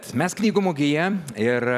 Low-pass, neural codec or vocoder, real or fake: 14.4 kHz; vocoder, 44.1 kHz, 128 mel bands every 256 samples, BigVGAN v2; fake